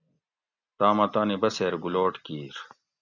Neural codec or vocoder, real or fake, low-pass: none; real; 7.2 kHz